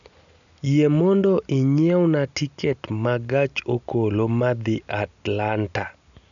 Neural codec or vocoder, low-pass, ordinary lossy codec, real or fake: none; 7.2 kHz; none; real